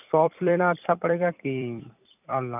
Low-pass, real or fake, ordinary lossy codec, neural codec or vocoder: 3.6 kHz; fake; none; vocoder, 44.1 kHz, 128 mel bands every 256 samples, BigVGAN v2